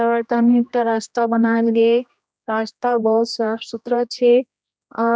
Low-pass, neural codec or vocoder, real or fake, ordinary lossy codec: none; codec, 16 kHz, 1 kbps, X-Codec, HuBERT features, trained on general audio; fake; none